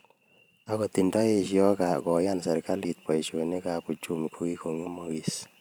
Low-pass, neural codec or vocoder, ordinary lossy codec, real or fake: none; vocoder, 44.1 kHz, 128 mel bands every 512 samples, BigVGAN v2; none; fake